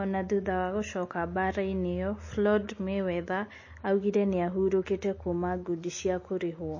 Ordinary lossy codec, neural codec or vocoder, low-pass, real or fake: MP3, 32 kbps; none; 7.2 kHz; real